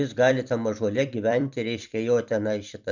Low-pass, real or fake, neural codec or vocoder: 7.2 kHz; fake; vocoder, 44.1 kHz, 128 mel bands every 256 samples, BigVGAN v2